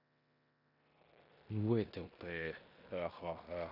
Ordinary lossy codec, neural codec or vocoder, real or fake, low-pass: none; codec, 16 kHz in and 24 kHz out, 0.9 kbps, LongCat-Audio-Codec, four codebook decoder; fake; 5.4 kHz